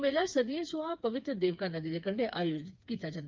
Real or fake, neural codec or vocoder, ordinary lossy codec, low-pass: fake; codec, 16 kHz, 4 kbps, FreqCodec, smaller model; Opus, 24 kbps; 7.2 kHz